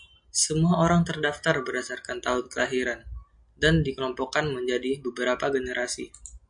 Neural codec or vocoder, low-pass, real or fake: none; 9.9 kHz; real